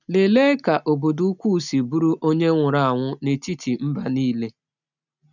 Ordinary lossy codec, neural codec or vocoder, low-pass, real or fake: none; none; 7.2 kHz; real